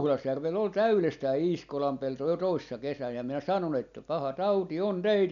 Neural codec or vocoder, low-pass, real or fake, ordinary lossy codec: none; 7.2 kHz; real; none